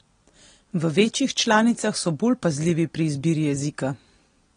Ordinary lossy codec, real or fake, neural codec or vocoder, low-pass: AAC, 32 kbps; real; none; 9.9 kHz